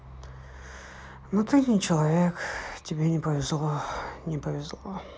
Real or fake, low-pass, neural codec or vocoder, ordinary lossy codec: real; none; none; none